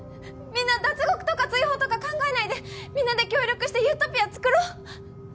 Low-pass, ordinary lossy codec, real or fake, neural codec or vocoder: none; none; real; none